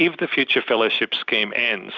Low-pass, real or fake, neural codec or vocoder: 7.2 kHz; real; none